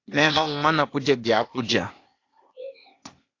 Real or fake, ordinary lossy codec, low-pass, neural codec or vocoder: fake; AAC, 48 kbps; 7.2 kHz; codec, 16 kHz, 0.8 kbps, ZipCodec